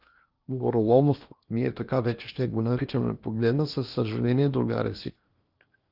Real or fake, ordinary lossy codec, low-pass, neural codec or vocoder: fake; Opus, 24 kbps; 5.4 kHz; codec, 16 kHz in and 24 kHz out, 0.8 kbps, FocalCodec, streaming, 65536 codes